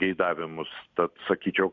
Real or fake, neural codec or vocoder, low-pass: real; none; 7.2 kHz